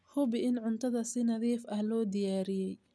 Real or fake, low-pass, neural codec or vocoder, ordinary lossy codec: real; none; none; none